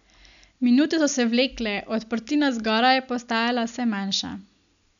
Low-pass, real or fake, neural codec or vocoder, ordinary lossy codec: 7.2 kHz; real; none; none